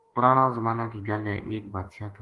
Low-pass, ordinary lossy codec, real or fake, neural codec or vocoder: 10.8 kHz; Opus, 24 kbps; fake; autoencoder, 48 kHz, 32 numbers a frame, DAC-VAE, trained on Japanese speech